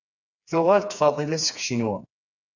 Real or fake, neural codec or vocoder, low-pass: fake; codec, 16 kHz, 4 kbps, FreqCodec, smaller model; 7.2 kHz